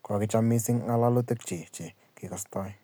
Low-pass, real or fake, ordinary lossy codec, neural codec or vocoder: none; real; none; none